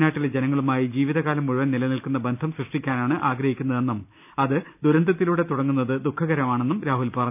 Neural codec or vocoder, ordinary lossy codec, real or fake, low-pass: none; none; real; 3.6 kHz